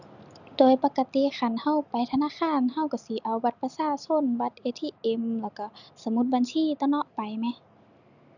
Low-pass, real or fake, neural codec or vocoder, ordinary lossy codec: 7.2 kHz; real; none; none